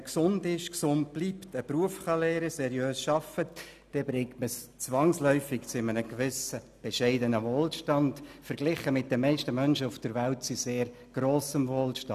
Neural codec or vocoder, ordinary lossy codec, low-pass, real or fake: none; none; 14.4 kHz; real